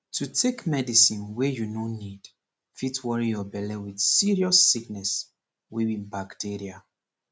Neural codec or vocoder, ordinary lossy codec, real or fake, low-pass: none; none; real; none